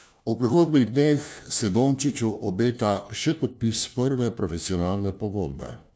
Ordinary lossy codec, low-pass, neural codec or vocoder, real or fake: none; none; codec, 16 kHz, 1 kbps, FunCodec, trained on LibriTTS, 50 frames a second; fake